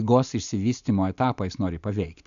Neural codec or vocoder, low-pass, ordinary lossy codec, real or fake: none; 7.2 kHz; AAC, 96 kbps; real